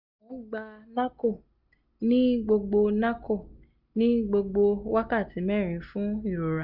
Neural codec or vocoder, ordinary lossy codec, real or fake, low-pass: none; none; real; 5.4 kHz